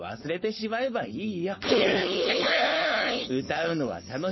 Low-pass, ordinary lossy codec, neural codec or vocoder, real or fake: 7.2 kHz; MP3, 24 kbps; codec, 16 kHz, 4.8 kbps, FACodec; fake